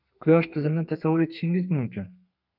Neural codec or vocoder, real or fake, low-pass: codec, 32 kHz, 1.9 kbps, SNAC; fake; 5.4 kHz